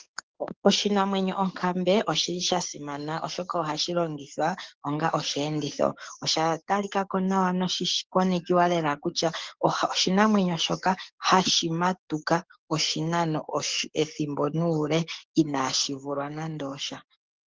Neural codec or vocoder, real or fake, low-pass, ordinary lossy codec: codec, 44.1 kHz, 7.8 kbps, DAC; fake; 7.2 kHz; Opus, 16 kbps